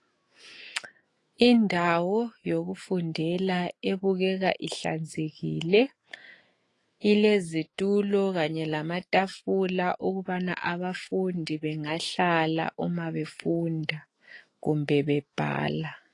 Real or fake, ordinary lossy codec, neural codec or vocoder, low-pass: fake; AAC, 32 kbps; autoencoder, 48 kHz, 128 numbers a frame, DAC-VAE, trained on Japanese speech; 10.8 kHz